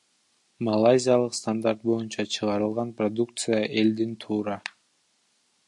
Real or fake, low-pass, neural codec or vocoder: real; 10.8 kHz; none